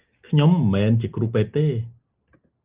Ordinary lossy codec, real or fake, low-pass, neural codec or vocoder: Opus, 24 kbps; real; 3.6 kHz; none